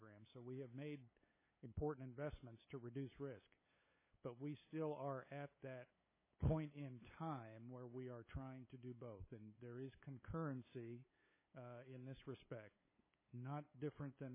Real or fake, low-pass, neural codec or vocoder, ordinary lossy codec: fake; 3.6 kHz; codec, 16 kHz, 8 kbps, FunCodec, trained on LibriTTS, 25 frames a second; MP3, 16 kbps